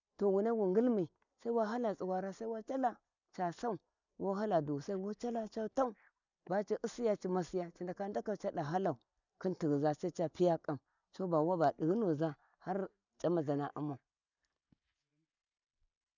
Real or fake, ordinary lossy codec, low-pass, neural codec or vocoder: real; none; 7.2 kHz; none